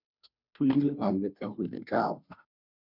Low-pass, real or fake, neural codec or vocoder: 5.4 kHz; fake; codec, 16 kHz, 0.5 kbps, FunCodec, trained on Chinese and English, 25 frames a second